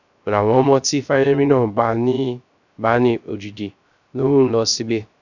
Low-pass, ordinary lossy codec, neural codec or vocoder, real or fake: 7.2 kHz; none; codec, 16 kHz, 0.3 kbps, FocalCodec; fake